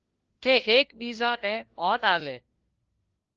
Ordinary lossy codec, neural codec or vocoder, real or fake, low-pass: Opus, 16 kbps; codec, 16 kHz, 1 kbps, FunCodec, trained on LibriTTS, 50 frames a second; fake; 7.2 kHz